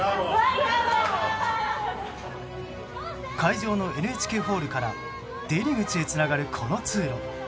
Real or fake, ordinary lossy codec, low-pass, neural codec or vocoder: real; none; none; none